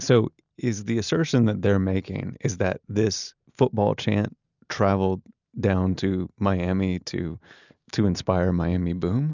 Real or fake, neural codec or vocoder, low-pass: real; none; 7.2 kHz